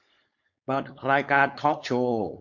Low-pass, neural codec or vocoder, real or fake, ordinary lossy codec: 7.2 kHz; codec, 16 kHz, 4.8 kbps, FACodec; fake; MP3, 48 kbps